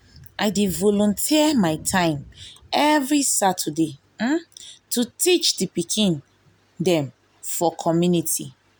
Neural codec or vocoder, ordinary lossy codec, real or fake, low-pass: none; none; real; none